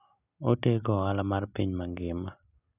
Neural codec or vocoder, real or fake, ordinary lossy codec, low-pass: none; real; none; 3.6 kHz